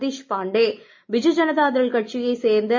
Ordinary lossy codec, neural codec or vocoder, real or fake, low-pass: MP3, 32 kbps; none; real; 7.2 kHz